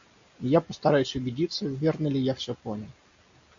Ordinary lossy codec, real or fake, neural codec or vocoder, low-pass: MP3, 64 kbps; real; none; 7.2 kHz